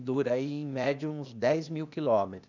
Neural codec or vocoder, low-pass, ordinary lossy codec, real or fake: codec, 16 kHz, 0.8 kbps, ZipCodec; 7.2 kHz; none; fake